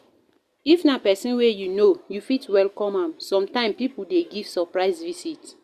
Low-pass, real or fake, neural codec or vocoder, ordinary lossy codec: 14.4 kHz; real; none; Opus, 64 kbps